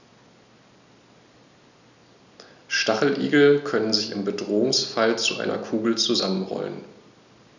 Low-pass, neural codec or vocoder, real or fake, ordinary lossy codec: 7.2 kHz; none; real; none